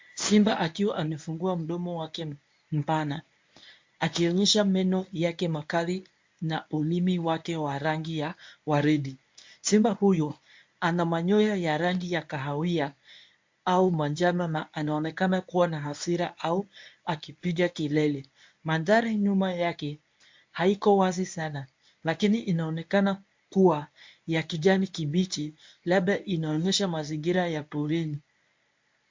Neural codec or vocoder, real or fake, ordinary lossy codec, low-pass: codec, 24 kHz, 0.9 kbps, WavTokenizer, medium speech release version 1; fake; MP3, 48 kbps; 7.2 kHz